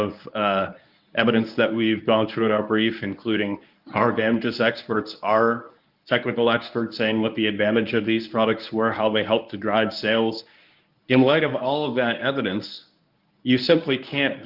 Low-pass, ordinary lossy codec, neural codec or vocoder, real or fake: 5.4 kHz; Opus, 24 kbps; codec, 24 kHz, 0.9 kbps, WavTokenizer, medium speech release version 1; fake